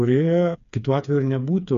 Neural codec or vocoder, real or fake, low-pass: codec, 16 kHz, 4 kbps, FreqCodec, smaller model; fake; 7.2 kHz